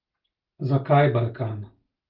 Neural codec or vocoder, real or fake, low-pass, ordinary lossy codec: none; real; 5.4 kHz; Opus, 16 kbps